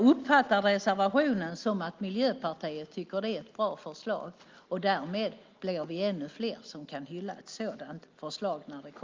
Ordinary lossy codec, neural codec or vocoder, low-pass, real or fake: Opus, 32 kbps; none; 7.2 kHz; real